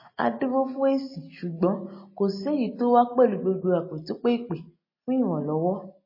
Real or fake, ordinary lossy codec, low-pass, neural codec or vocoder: real; MP3, 24 kbps; 5.4 kHz; none